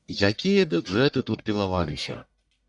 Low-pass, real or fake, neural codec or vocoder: 10.8 kHz; fake; codec, 44.1 kHz, 1.7 kbps, Pupu-Codec